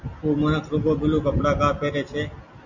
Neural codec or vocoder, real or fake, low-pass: none; real; 7.2 kHz